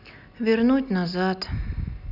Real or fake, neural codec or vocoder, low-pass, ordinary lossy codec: real; none; 5.4 kHz; none